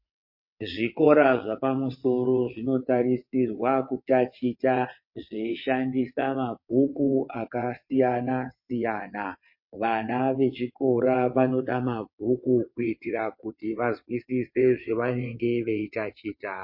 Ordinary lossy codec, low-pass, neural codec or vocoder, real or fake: MP3, 32 kbps; 5.4 kHz; vocoder, 22.05 kHz, 80 mel bands, WaveNeXt; fake